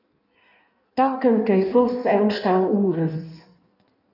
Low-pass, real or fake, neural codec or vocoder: 5.4 kHz; fake; codec, 16 kHz in and 24 kHz out, 1.1 kbps, FireRedTTS-2 codec